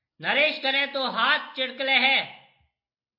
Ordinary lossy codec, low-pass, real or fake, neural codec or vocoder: MP3, 32 kbps; 5.4 kHz; real; none